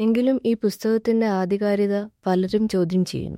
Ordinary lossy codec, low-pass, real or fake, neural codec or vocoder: MP3, 64 kbps; 19.8 kHz; fake; autoencoder, 48 kHz, 32 numbers a frame, DAC-VAE, trained on Japanese speech